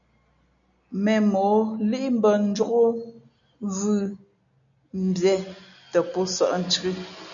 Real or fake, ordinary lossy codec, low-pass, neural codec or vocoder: real; MP3, 96 kbps; 7.2 kHz; none